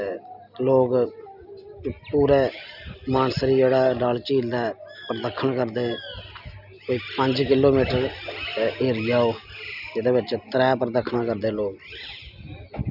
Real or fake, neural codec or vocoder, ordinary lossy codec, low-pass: real; none; none; 5.4 kHz